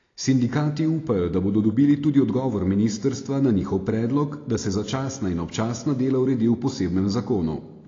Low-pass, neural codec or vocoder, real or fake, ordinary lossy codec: 7.2 kHz; none; real; AAC, 32 kbps